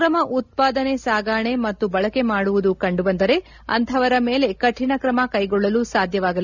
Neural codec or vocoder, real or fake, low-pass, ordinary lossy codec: none; real; 7.2 kHz; none